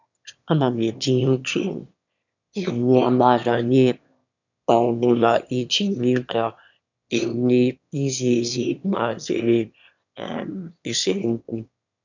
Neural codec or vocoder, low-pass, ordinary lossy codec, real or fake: autoencoder, 22.05 kHz, a latent of 192 numbers a frame, VITS, trained on one speaker; 7.2 kHz; none; fake